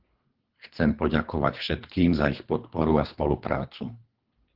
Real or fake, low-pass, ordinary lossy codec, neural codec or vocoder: fake; 5.4 kHz; Opus, 16 kbps; codec, 24 kHz, 3 kbps, HILCodec